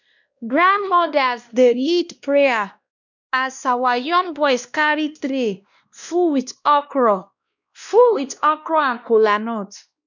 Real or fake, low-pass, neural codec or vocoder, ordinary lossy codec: fake; 7.2 kHz; codec, 16 kHz, 1 kbps, X-Codec, WavLM features, trained on Multilingual LibriSpeech; none